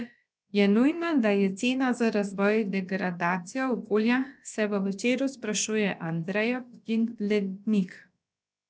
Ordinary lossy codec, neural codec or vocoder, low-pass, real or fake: none; codec, 16 kHz, about 1 kbps, DyCAST, with the encoder's durations; none; fake